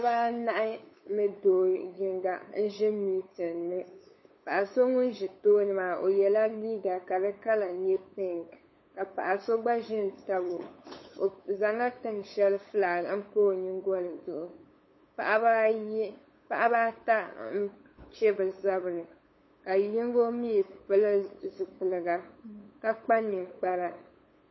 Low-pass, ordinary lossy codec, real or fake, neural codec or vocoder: 7.2 kHz; MP3, 24 kbps; fake; codec, 16 kHz, 8 kbps, FunCodec, trained on LibriTTS, 25 frames a second